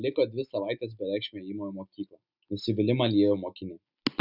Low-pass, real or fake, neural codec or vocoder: 5.4 kHz; real; none